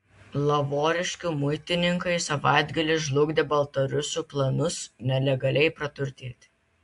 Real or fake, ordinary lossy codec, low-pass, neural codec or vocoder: real; AAC, 64 kbps; 10.8 kHz; none